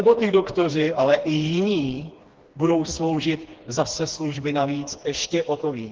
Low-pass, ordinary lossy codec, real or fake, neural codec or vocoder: 7.2 kHz; Opus, 16 kbps; fake; codec, 16 kHz, 2 kbps, FreqCodec, smaller model